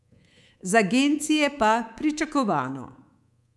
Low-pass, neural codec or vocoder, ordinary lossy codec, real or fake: none; codec, 24 kHz, 3.1 kbps, DualCodec; none; fake